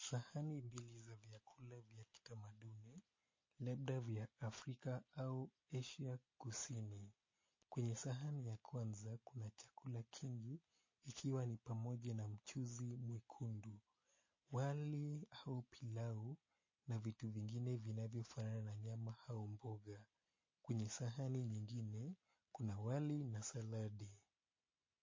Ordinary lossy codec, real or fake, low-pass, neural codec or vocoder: MP3, 32 kbps; real; 7.2 kHz; none